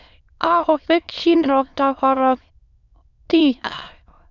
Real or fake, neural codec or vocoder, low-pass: fake; autoencoder, 22.05 kHz, a latent of 192 numbers a frame, VITS, trained on many speakers; 7.2 kHz